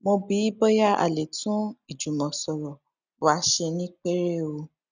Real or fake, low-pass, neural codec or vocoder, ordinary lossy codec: real; 7.2 kHz; none; none